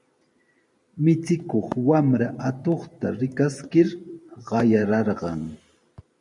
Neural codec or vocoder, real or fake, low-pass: vocoder, 44.1 kHz, 128 mel bands every 512 samples, BigVGAN v2; fake; 10.8 kHz